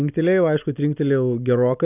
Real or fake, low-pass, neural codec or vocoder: real; 3.6 kHz; none